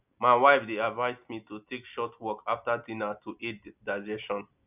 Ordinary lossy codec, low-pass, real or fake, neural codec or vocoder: none; 3.6 kHz; real; none